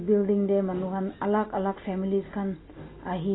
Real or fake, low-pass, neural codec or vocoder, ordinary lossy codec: real; 7.2 kHz; none; AAC, 16 kbps